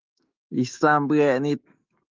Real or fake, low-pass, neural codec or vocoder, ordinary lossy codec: fake; 7.2 kHz; autoencoder, 48 kHz, 128 numbers a frame, DAC-VAE, trained on Japanese speech; Opus, 32 kbps